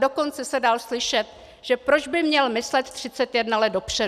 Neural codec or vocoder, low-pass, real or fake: none; 14.4 kHz; real